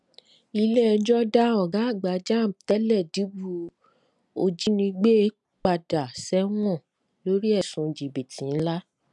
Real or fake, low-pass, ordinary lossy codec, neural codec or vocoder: real; 10.8 kHz; none; none